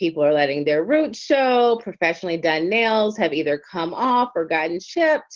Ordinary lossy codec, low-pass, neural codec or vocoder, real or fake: Opus, 16 kbps; 7.2 kHz; none; real